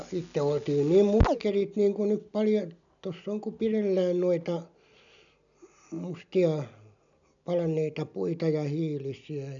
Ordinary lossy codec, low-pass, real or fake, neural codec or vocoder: none; 7.2 kHz; real; none